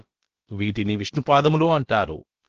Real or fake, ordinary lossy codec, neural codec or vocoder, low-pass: fake; Opus, 16 kbps; codec, 16 kHz, about 1 kbps, DyCAST, with the encoder's durations; 7.2 kHz